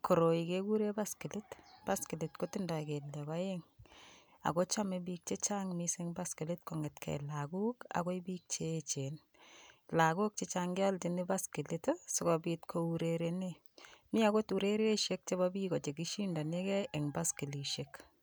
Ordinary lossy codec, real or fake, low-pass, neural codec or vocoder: none; real; none; none